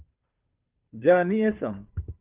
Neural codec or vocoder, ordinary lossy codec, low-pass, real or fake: codec, 16 kHz, 2 kbps, FunCodec, trained on Chinese and English, 25 frames a second; Opus, 24 kbps; 3.6 kHz; fake